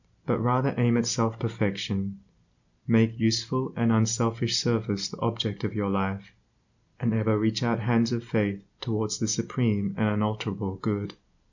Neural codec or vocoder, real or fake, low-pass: none; real; 7.2 kHz